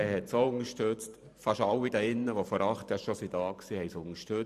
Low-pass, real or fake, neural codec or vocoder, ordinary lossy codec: 14.4 kHz; fake; vocoder, 44.1 kHz, 128 mel bands every 512 samples, BigVGAN v2; none